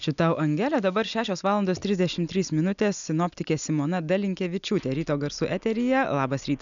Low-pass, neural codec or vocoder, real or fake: 7.2 kHz; none; real